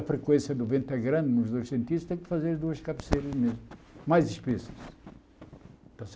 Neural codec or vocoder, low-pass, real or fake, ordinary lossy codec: none; none; real; none